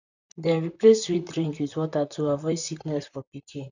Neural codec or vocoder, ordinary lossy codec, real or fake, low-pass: vocoder, 44.1 kHz, 128 mel bands, Pupu-Vocoder; none; fake; 7.2 kHz